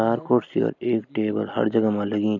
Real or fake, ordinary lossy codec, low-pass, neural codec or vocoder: real; none; 7.2 kHz; none